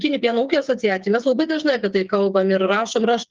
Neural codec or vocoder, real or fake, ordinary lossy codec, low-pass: codec, 16 kHz, 4 kbps, FreqCodec, smaller model; fake; Opus, 32 kbps; 7.2 kHz